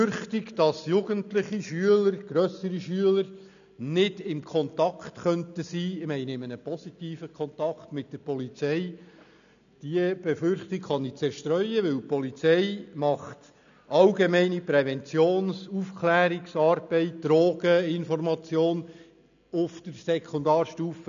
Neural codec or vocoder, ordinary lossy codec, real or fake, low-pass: none; none; real; 7.2 kHz